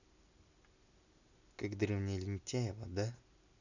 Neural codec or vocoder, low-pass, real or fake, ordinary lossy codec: none; 7.2 kHz; real; none